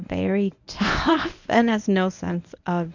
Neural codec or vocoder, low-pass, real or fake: codec, 24 kHz, 0.9 kbps, WavTokenizer, medium speech release version 1; 7.2 kHz; fake